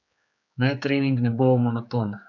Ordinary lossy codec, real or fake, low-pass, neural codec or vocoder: none; fake; 7.2 kHz; codec, 16 kHz, 4 kbps, X-Codec, HuBERT features, trained on general audio